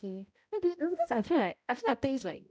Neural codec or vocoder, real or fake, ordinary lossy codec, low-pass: codec, 16 kHz, 0.5 kbps, X-Codec, HuBERT features, trained on balanced general audio; fake; none; none